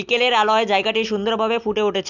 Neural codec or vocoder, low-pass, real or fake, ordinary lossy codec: none; 7.2 kHz; real; none